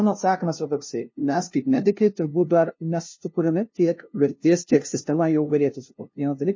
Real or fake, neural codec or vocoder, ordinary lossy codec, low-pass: fake; codec, 16 kHz, 0.5 kbps, FunCodec, trained on LibriTTS, 25 frames a second; MP3, 32 kbps; 7.2 kHz